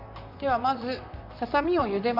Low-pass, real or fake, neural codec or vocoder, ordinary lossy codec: 5.4 kHz; fake; vocoder, 44.1 kHz, 128 mel bands, Pupu-Vocoder; Opus, 64 kbps